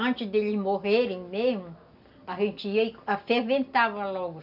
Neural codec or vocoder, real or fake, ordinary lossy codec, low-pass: none; real; none; 5.4 kHz